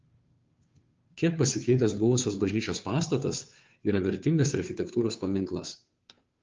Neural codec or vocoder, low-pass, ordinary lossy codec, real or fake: codec, 16 kHz, 2 kbps, FunCodec, trained on Chinese and English, 25 frames a second; 7.2 kHz; Opus, 24 kbps; fake